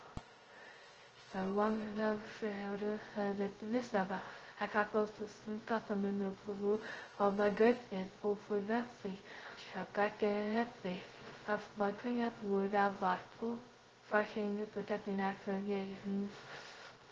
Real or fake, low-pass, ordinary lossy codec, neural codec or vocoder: fake; 7.2 kHz; Opus, 16 kbps; codec, 16 kHz, 0.2 kbps, FocalCodec